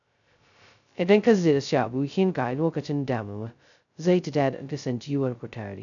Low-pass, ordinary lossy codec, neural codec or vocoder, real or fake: 7.2 kHz; none; codec, 16 kHz, 0.2 kbps, FocalCodec; fake